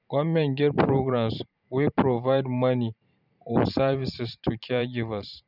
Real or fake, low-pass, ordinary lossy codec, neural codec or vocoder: fake; 5.4 kHz; none; vocoder, 44.1 kHz, 128 mel bands every 512 samples, BigVGAN v2